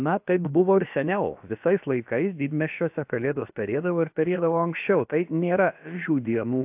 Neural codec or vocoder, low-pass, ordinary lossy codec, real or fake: codec, 16 kHz, about 1 kbps, DyCAST, with the encoder's durations; 3.6 kHz; AAC, 32 kbps; fake